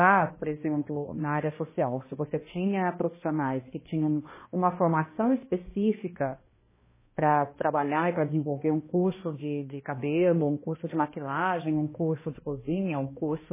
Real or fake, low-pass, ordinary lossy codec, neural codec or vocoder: fake; 3.6 kHz; MP3, 16 kbps; codec, 16 kHz, 1 kbps, X-Codec, HuBERT features, trained on balanced general audio